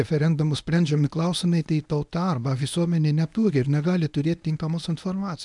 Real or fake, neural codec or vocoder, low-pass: fake; codec, 24 kHz, 0.9 kbps, WavTokenizer, medium speech release version 1; 10.8 kHz